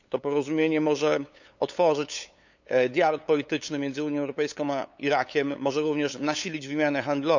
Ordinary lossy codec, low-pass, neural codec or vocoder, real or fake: none; 7.2 kHz; codec, 16 kHz, 8 kbps, FunCodec, trained on LibriTTS, 25 frames a second; fake